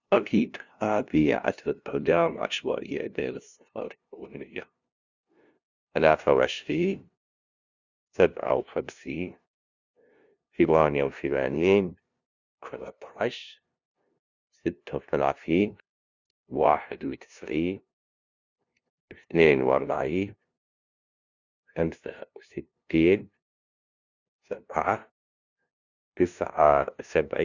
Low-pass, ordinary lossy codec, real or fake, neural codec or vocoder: 7.2 kHz; Opus, 64 kbps; fake; codec, 16 kHz, 0.5 kbps, FunCodec, trained on LibriTTS, 25 frames a second